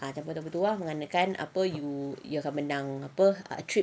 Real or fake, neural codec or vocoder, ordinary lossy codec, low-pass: real; none; none; none